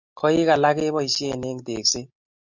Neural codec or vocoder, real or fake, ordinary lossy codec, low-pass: none; real; MP3, 48 kbps; 7.2 kHz